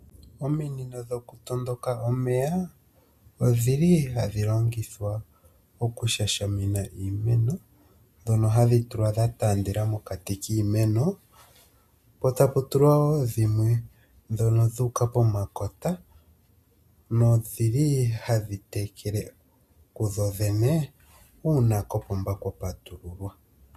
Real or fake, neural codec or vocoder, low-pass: real; none; 14.4 kHz